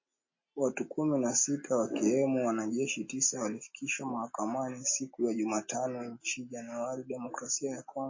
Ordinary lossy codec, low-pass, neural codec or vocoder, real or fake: MP3, 32 kbps; 7.2 kHz; none; real